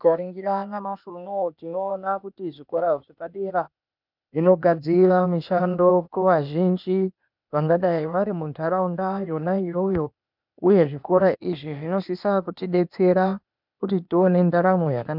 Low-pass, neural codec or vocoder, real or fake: 5.4 kHz; codec, 16 kHz, 0.8 kbps, ZipCodec; fake